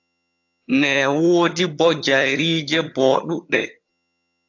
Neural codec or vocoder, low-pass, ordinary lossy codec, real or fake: vocoder, 22.05 kHz, 80 mel bands, HiFi-GAN; 7.2 kHz; AAC, 48 kbps; fake